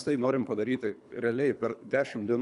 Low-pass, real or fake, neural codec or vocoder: 10.8 kHz; fake; codec, 24 kHz, 3 kbps, HILCodec